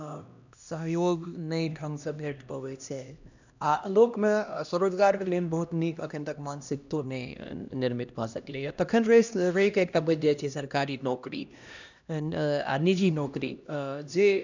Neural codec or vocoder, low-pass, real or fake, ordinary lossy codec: codec, 16 kHz, 1 kbps, X-Codec, HuBERT features, trained on LibriSpeech; 7.2 kHz; fake; none